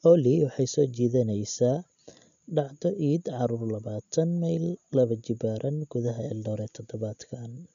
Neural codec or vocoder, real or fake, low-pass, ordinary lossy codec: none; real; 7.2 kHz; none